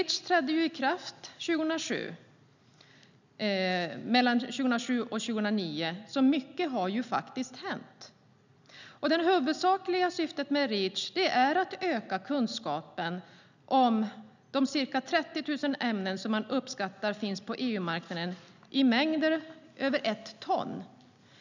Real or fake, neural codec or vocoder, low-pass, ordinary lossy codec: real; none; 7.2 kHz; none